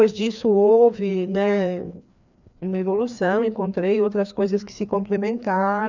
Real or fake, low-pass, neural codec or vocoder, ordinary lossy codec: fake; 7.2 kHz; codec, 16 kHz, 2 kbps, FreqCodec, larger model; none